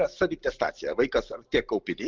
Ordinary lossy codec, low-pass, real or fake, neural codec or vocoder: Opus, 24 kbps; 7.2 kHz; real; none